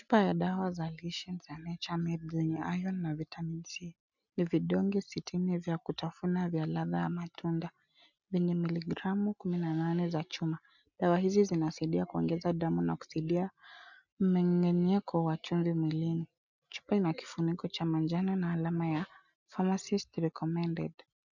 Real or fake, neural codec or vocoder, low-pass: real; none; 7.2 kHz